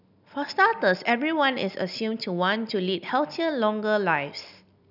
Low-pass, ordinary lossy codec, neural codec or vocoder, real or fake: 5.4 kHz; none; none; real